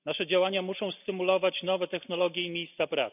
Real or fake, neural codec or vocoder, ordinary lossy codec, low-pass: real; none; none; 3.6 kHz